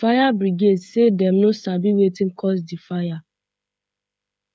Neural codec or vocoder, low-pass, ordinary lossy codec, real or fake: codec, 16 kHz, 8 kbps, FreqCodec, smaller model; none; none; fake